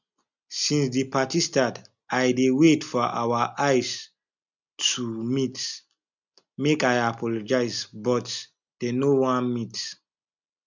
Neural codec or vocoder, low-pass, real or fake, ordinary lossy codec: none; 7.2 kHz; real; none